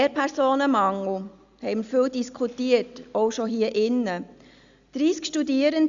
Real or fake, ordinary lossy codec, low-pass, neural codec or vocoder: real; Opus, 64 kbps; 7.2 kHz; none